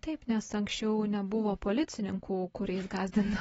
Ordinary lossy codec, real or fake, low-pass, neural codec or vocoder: AAC, 24 kbps; real; 10.8 kHz; none